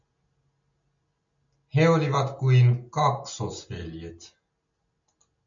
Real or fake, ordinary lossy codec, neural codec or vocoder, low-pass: real; MP3, 64 kbps; none; 7.2 kHz